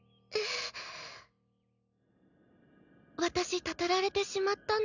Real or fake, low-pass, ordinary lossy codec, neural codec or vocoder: real; 7.2 kHz; none; none